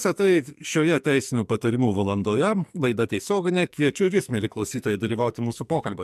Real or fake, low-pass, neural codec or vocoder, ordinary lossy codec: fake; 14.4 kHz; codec, 44.1 kHz, 2.6 kbps, SNAC; MP3, 96 kbps